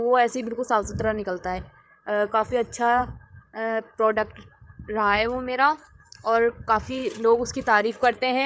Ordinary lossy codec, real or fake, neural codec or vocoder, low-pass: none; fake; codec, 16 kHz, 8 kbps, FreqCodec, larger model; none